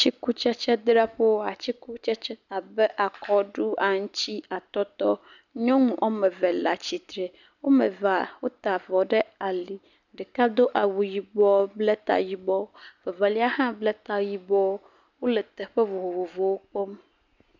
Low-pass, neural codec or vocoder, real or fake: 7.2 kHz; none; real